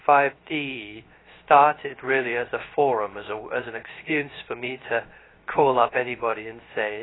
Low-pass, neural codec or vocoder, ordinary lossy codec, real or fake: 7.2 kHz; codec, 16 kHz, 0.3 kbps, FocalCodec; AAC, 16 kbps; fake